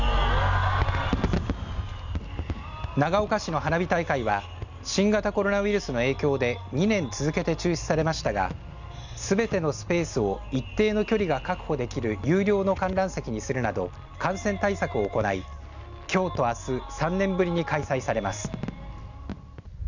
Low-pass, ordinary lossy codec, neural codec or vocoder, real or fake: 7.2 kHz; none; none; real